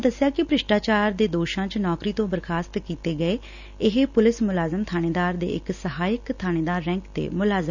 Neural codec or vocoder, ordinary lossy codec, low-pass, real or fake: none; none; 7.2 kHz; real